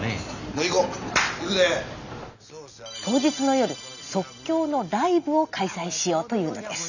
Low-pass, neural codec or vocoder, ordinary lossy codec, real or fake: 7.2 kHz; none; none; real